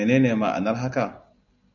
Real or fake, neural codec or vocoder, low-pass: real; none; 7.2 kHz